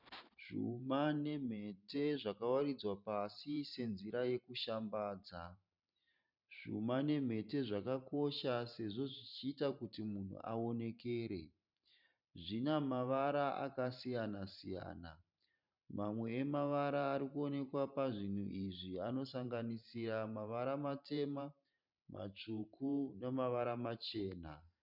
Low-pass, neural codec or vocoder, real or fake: 5.4 kHz; vocoder, 44.1 kHz, 128 mel bands every 512 samples, BigVGAN v2; fake